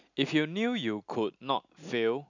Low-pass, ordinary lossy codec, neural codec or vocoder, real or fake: 7.2 kHz; none; none; real